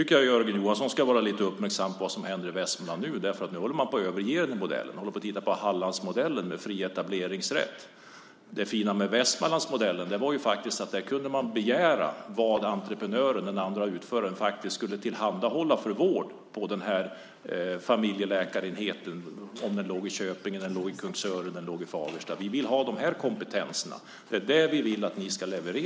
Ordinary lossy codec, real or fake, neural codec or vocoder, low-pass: none; real; none; none